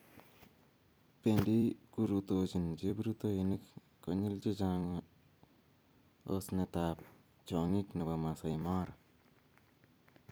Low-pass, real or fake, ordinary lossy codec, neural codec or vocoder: none; fake; none; vocoder, 44.1 kHz, 128 mel bands every 256 samples, BigVGAN v2